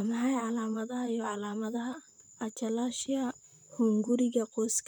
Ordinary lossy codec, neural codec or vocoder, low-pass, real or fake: none; vocoder, 44.1 kHz, 128 mel bands, Pupu-Vocoder; 19.8 kHz; fake